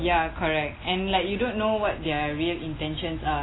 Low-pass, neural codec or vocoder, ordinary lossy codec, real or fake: 7.2 kHz; none; AAC, 16 kbps; real